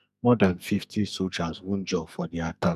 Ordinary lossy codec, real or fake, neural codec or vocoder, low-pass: MP3, 96 kbps; fake; codec, 44.1 kHz, 2.6 kbps, SNAC; 14.4 kHz